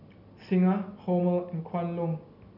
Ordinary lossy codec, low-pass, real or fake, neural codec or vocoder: none; 5.4 kHz; real; none